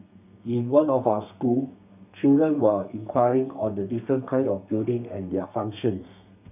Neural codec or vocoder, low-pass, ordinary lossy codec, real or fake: codec, 32 kHz, 1.9 kbps, SNAC; 3.6 kHz; none; fake